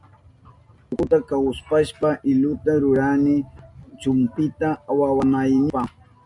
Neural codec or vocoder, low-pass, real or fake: none; 10.8 kHz; real